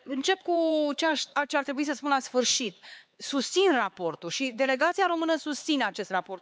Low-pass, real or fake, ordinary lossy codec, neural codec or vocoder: none; fake; none; codec, 16 kHz, 4 kbps, X-Codec, HuBERT features, trained on LibriSpeech